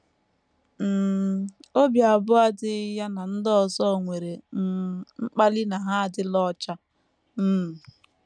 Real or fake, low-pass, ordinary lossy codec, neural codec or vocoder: real; 9.9 kHz; none; none